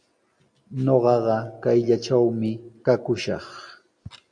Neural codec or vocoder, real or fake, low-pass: none; real; 9.9 kHz